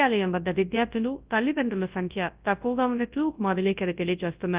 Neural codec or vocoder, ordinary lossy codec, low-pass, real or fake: codec, 24 kHz, 0.9 kbps, WavTokenizer, large speech release; Opus, 32 kbps; 3.6 kHz; fake